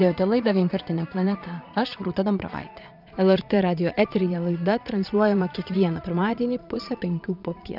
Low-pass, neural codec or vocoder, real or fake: 5.4 kHz; vocoder, 22.05 kHz, 80 mel bands, Vocos; fake